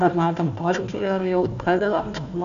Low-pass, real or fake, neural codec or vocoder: 7.2 kHz; fake; codec, 16 kHz, 1 kbps, FunCodec, trained on Chinese and English, 50 frames a second